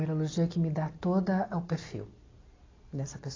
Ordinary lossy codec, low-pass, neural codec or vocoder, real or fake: AAC, 32 kbps; 7.2 kHz; none; real